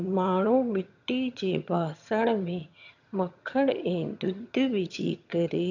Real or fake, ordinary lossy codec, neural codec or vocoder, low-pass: fake; none; vocoder, 22.05 kHz, 80 mel bands, HiFi-GAN; 7.2 kHz